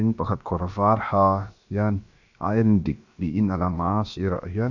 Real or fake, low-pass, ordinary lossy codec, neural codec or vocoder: fake; 7.2 kHz; AAC, 48 kbps; codec, 16 kHz, about 1 kbps, DyCAST, with the encoder's durations